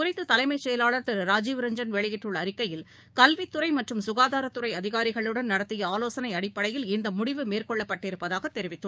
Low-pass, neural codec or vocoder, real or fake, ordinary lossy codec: none; codec, 16 kHz, 6 kbps, DAC; fake; none